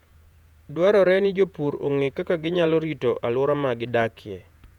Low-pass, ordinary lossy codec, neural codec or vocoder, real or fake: 19.8 kHz; none; vocoder, 48 kHz, 128 mel bands, Vocos; fake